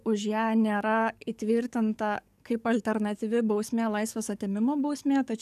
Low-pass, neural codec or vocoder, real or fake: 14.4 kHz; codec, 44.1 kHz, 7.8 kbps, DAC; fake